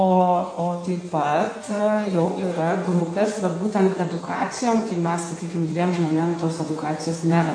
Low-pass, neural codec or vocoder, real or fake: 9.9 kHz; codec, 16 kHz in and 24 kHz out, 1.1 kbps, FireRedTTS-2 codec; fake